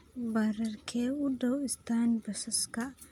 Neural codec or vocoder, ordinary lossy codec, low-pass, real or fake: none; none; 19.8 kHz; real